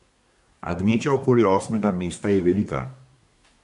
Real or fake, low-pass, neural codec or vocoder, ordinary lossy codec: fake; 10.8 kHz; codec, 24 kHz, 1 kbps, SNAC; AAC, 96 kbps